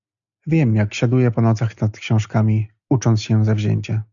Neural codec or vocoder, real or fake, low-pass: none; real; 7.2 kHz